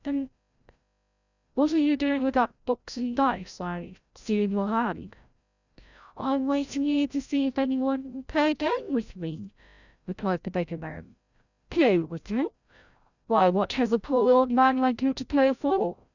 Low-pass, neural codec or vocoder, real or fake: 7.2 kHz; codec, 16 kHz, 0.5 kbps, FreqCodec, larger model; fake